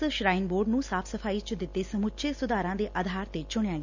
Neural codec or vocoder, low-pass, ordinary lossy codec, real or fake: none; 7.2 kHz; none; real